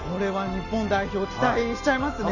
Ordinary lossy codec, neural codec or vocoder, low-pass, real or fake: none; none; 7.2 kHz; real